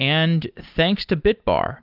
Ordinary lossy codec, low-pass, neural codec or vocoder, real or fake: Opus, 32 kbps; 5.4 kHz; none; real